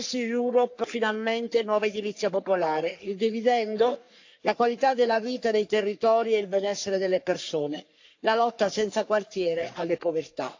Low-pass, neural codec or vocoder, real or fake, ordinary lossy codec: 7.2 kHz; codec, 44.1 kHz, 3.4 kbps, Pupu-Codec; fake; AAC, 48 kbps